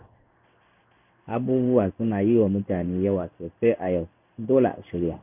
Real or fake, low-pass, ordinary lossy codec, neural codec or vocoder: fake; 3.6 kHz; none; codec, 16 kHz in and 24 kHz out, 1 kbps, XY-Tokenizer